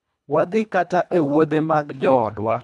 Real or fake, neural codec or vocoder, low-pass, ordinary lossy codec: fake; codec, 24 kHz, 1.5 kbps, HILCodec; none; none